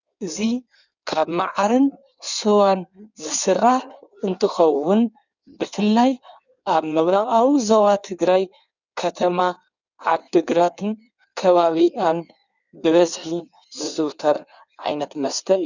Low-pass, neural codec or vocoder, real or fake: 7.2 kHz; codec, 16 kHz in and 24 kHz out, 1.1 kbps, FireRedTTS-2 codec; fake